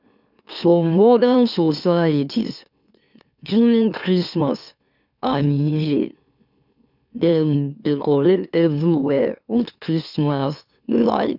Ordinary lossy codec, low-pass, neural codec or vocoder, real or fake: none; 5.4 kHz; autoencoder, 44.1 kHz, a latent of 192 numbers a frame, MeloTTS; fake